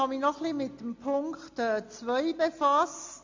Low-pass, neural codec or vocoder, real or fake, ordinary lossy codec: 7.2 kHz; none; real; MP3, 48 kbps